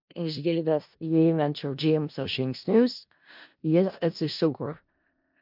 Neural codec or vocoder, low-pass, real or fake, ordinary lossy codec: codec, 16 kHz in and 24 kHz out, 0.4 kbps, LongCat-Audio-Codec, four codebook decoder; 5.4 kHz; fake; MP3, 48 kbps